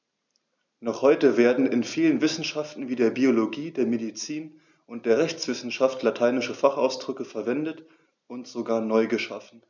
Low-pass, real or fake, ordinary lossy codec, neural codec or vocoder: 7.2 kHz; real; none; none